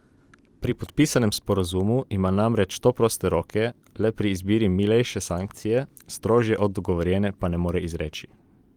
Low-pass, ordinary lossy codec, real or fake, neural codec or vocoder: 19.8 kHz; Opus, 32 kbps; fake; vocoder, 44.1 kHz, 128 mel bands every 512 samples, BigVGAN v2